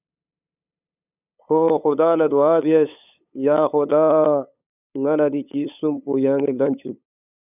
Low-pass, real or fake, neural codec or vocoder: 3.6 kHz; fake; codec, 16 kHz, 8 kbps, FunCodec, trained on LibriTTS, 25 frames a second